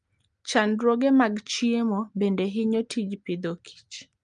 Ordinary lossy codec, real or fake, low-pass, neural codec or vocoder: Opus, 32 kbps; real; 10.8 kHz; none